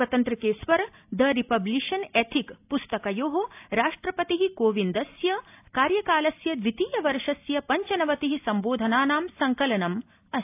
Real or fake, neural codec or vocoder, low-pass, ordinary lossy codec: real; none; 3.6 kHz; AAC, 32 kbps